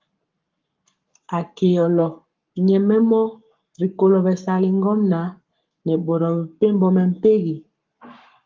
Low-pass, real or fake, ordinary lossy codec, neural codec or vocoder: 7.2 kHz; fake; Opus, 32 kbps; codec, 44.1 kHz, 7.8 kbps, Pupu-Codec